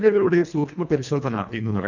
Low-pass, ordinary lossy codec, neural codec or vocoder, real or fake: 7.2 kHz; none; codec, 24 kHz, 1.5 kbps, HILCodec; fake